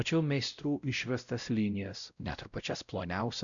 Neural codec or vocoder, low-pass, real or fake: codec, 16 kHz, 0.5 kbps, X-Codec, WavLM features, trained on Multilingual LibriSpeech; 7.2 kHz; fake